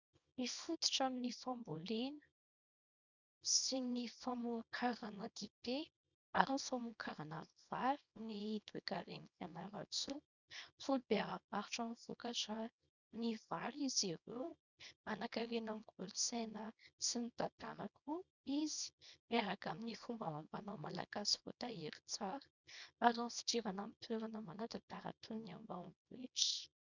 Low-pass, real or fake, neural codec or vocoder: 7.2 kHz; fake; codec, 24 kHz, 0.9 kbps, WavTokenizer, small release